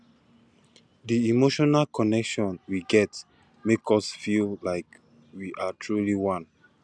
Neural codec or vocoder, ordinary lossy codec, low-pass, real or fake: none; none; none; real